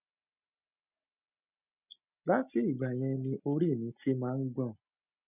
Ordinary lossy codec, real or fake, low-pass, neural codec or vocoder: none; real; 3.6 kHz; none